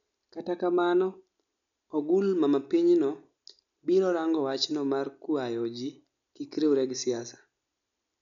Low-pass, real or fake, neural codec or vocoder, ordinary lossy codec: 7.2 kHz; real; none; none